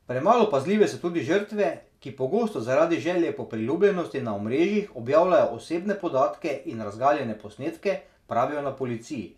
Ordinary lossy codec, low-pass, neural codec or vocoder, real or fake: none; 14.4 kHz; none; real